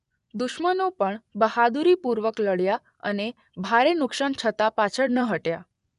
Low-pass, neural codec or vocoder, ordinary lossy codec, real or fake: 10.8 kHz; vocoder, 24 kHz, 100 mel bands, Vocos; none; fake